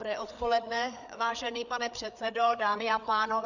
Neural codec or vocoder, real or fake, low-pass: codec, 16 kHz, 4 kbps, FreqCodec, larger model; fake; 7.2 kHz